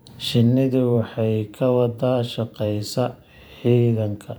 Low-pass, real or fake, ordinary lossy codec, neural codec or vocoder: none; fake; none; vocoder, 44.1 kHz, 128 mel bands every 512 samples, BigVGAN v2